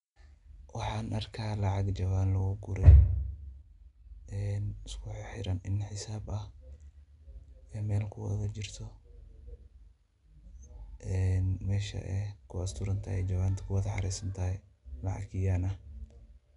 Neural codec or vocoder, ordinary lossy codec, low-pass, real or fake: none; none; 10.8 kHz; real